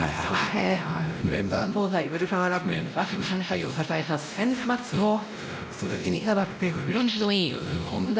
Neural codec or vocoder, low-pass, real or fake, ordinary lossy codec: codec, 16 kHz, 0.5 kbps, X-Codec, WavLM features, trained on Multilingual LibriSpeech; none; fake; none